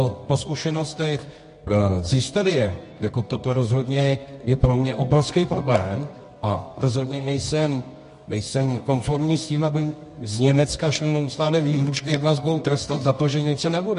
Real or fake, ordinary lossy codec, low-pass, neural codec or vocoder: fake; MP3, 48 kbps; 10.8 kHz; codec, 24 kHz, 0.9 kbps, WavTokenizer, medium music audio release